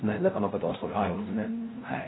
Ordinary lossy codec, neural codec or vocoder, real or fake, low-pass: AAC, 16 kbps; codec, 16 kHz, 0.5 kbps, FunCodec, trained on LibriTTS, 25 frames a second; fake; 7.2 kHz